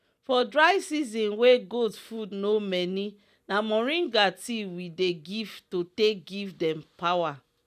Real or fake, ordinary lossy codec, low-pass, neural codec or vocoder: real; none; 14.4 kHz; none